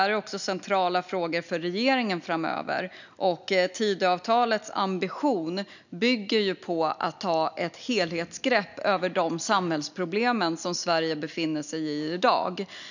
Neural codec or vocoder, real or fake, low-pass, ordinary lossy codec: none; real; 7.2 kHz; none